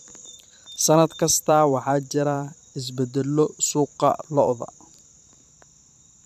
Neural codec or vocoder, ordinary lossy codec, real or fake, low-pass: vocoder, 44.1 kHz, 128 mel bands every 512 samples, BigVGAN v2; AAC, 96 kbps; fake; 14.4 kHz